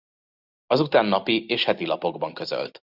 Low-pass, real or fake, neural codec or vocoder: 5.4 kHz; real; none